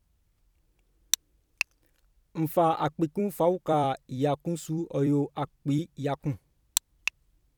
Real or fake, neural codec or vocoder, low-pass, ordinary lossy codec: fake; vocoder, 44.1 kHz, 128 mel bands every 256 samples, BigVGAN v2; 19.8 kHz; none